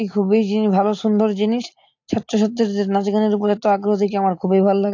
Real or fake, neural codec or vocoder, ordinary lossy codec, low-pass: real; none; AAC, 48 kbps; 7.2 kHz